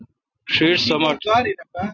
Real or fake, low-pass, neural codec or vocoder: real; 7.2 kHz; none